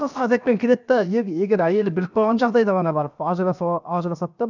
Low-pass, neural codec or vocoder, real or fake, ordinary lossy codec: 7.2 kHz; codec, 16 kHz, 0.7 kbps, FocalCodec; fake; none